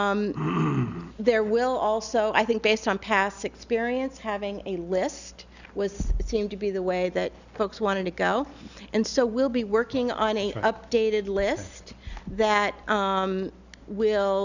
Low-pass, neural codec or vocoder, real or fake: 7.2 kHz; none; real